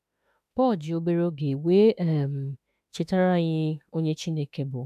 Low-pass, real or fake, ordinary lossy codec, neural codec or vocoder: 14.4 kHz; fake; none; autoencoder, 48 kHz, 32 numbers a frame, DAC-VAE, trained on Japanese speech